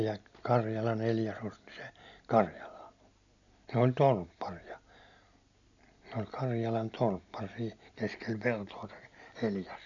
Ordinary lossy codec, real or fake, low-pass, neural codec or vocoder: none; real; 7.2 kHz; none